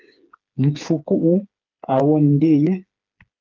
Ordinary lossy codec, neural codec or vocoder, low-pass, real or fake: Opus, 24 kbps; codec, 16 kHz, 4 kbps, FreqCodec, smaller model; 7.2 kHz; fake